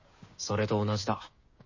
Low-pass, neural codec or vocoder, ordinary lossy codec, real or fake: 7.2 kHz; codec, 16 kHz, 6 kbps, DAC; MP3, 32 kbps; fake